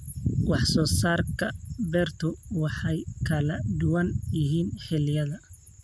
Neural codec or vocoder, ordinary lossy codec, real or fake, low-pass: none; none; real; none